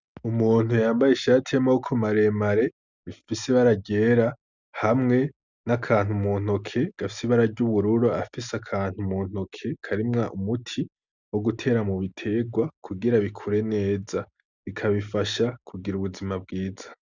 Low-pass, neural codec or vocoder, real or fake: 7.2 kHz; none; real